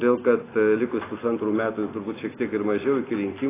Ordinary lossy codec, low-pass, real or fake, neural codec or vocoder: AAC, 16 kbps; 3.6 kHz; real; none